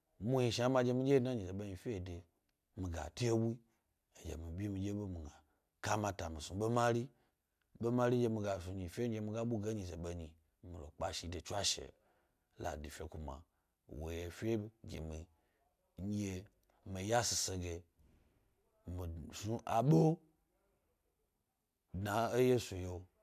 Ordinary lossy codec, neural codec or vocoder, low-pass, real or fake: none; none; 14.4 kHz; real